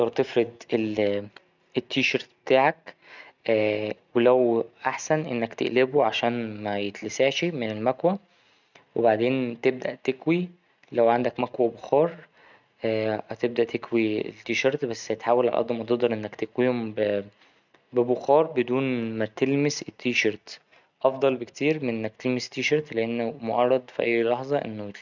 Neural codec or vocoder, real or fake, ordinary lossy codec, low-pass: none; real; none; 7.2 kHz